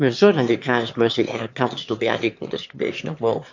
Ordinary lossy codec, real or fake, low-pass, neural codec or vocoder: MP3, 48 kbps; fake; 7.2 kHz; autoencoder, 22.05 kHz, a latent of 192 numbers a frame, VITS, trained on one speaker